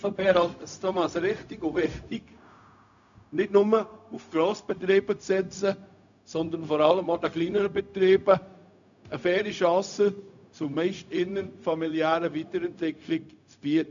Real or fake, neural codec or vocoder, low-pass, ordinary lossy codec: fake; codec, 16 kHz, 0.4 kbps, LongCat-Audio-Codec; 7.2 kHz; MP3, 96 kbps